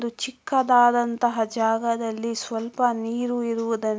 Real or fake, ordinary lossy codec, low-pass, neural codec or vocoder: real; none; none; none